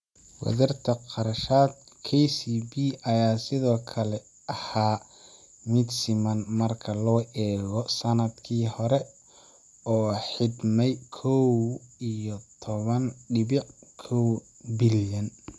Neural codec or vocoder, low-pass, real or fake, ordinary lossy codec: none; none; real; none